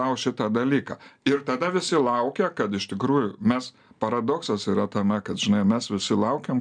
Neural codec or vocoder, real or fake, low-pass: none; real; 9.9 kHz